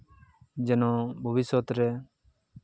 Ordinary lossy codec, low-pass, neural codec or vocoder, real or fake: none; none; none; real